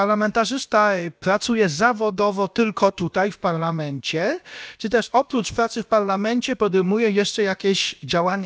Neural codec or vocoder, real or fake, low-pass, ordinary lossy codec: codec, 16 kHz, about 1 kbps, DyCAST, with the encoder's durations; fake; none; none